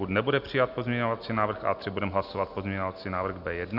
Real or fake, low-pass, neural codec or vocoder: real; 5.4 kHz; none